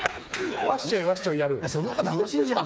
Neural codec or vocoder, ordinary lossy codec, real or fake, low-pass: codec, 16 kHz, 4 kbps, FreqCodec, smaller model; none; fake; none